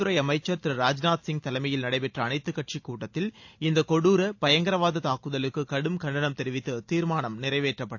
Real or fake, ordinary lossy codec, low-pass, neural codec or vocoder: real; AAC, 48 kbps; 7.2 kHz; none